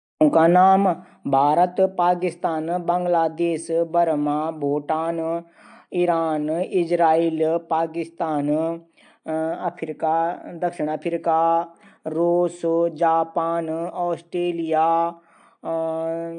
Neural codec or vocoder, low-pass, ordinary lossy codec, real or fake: none; 9.9 kHz; AAC, 64 kbps; real